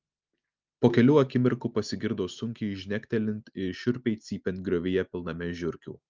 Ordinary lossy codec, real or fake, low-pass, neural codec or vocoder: Opus, 24 kbps; real; 7.2 kHz; none